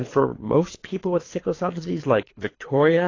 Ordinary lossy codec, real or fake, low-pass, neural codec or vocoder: AAC, 32 kbps; fake; 7.2 kHz; codec, 24 kHz, 3 kbps, HILCodec